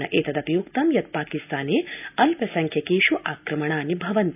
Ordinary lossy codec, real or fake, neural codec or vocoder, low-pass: AAC, 32 kbps; real; none; 3.6 kHz